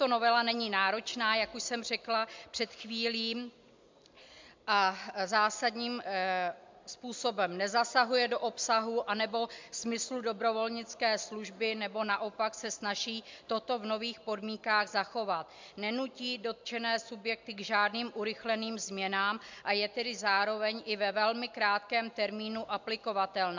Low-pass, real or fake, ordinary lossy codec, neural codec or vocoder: 7.2 kHz; real; MP3, 64 kbps; none